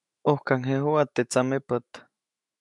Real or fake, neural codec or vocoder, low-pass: fake; autoencoder, 48 kHz, 128 numbers a frame, DAC-VAE, trained on Japanese speech; 10.8 kHz